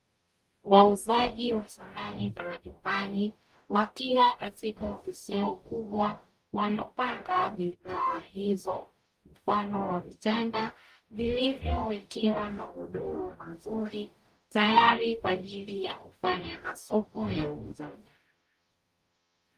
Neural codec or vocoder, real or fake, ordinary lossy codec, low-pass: codec, 44.1 kHz, 0.9 kbps, DAC; fake; Opus, 32 kbps; 14.4 kHz